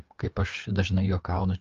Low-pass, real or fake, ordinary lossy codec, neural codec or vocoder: 7.2 kHz; fake; Opus, 32 kbps; codec, 16 kHz, 4 kbps, FreqCodec, larger model